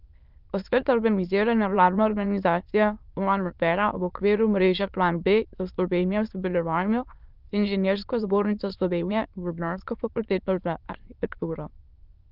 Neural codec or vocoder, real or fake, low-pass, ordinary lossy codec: autoencoder, 22.05 kHz, a latent of 192 numbers a frame, VITS, trained on many speakers; fake; 5.4 kHz; Opus, 32 kbps